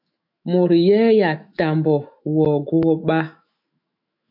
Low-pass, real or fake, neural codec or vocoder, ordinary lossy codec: 5.4 kHz; fake; autoencoder, 48 kHz, 128 numbers a frame, DAC-VAE, trained on Japanese speech; AAC, 48 kbps